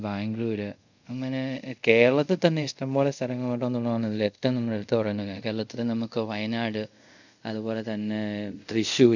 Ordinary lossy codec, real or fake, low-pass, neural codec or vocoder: none; fake; 7.2 kHz; codec, 24 kHz, 0.5 kbps, DualCodec